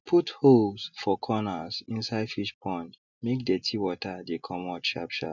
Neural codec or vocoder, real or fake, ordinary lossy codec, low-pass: none; real; none; 7.2 kHz